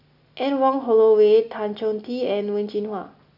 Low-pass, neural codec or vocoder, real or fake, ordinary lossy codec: 5.4 kHz; none; real; none